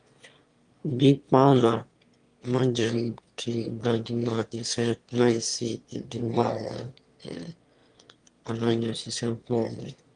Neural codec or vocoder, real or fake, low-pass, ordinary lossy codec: autoencoder, 22.05 kHz, a latent of 192 numbers a frame, VITS, trained on one speaker; fake; 9.9 kHz; Opus, 32 kbps